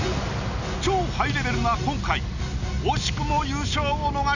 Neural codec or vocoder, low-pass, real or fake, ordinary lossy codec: none; 7.2 kHz; real; none